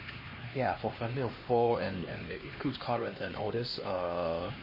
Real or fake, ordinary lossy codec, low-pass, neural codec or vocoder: fake; MP3, 24 kbps; 5.4 kHz; codec, 16 kHz, 2 kbps, X-Codec, HuBERT features, trained on LibriSpeech